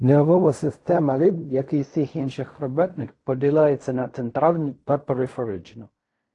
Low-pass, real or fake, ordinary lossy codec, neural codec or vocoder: 10.8 kHz; fake; AAC, 48 kbps; codec, 16 kHz in and 24 kHz out, 0.4 kbps, LongCat-Audio-Codec, fine tuned four codebook decoder